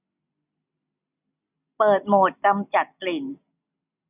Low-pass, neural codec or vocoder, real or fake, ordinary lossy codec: 3.6 kHz; none; real; none